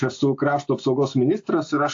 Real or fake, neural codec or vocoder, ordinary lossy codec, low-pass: real; none; AAC, 48 kbps; 7.2 kHz